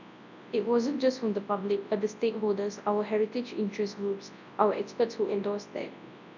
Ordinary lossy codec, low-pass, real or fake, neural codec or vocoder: none; 7.2 kHz; fake; codec, 24 kHz, 0.9 kbps, WavTokenizer, large speech release